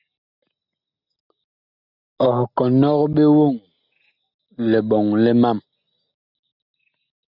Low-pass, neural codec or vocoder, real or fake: 5.4 kHz; none; real